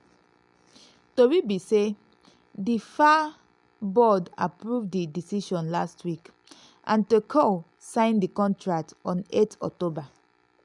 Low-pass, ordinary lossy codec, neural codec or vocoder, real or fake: 10.8 kHz; none; none; real